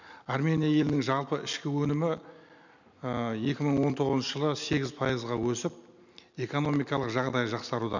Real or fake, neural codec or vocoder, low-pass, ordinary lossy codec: real; none; 7.2 kHz; none